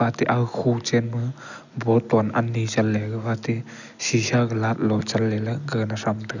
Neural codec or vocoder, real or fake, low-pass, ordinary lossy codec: none; real; 7.2 kHz; none